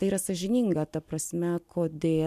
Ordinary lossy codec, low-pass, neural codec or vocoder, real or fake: MP3, 96 kbps; 14.4 kHz; none; real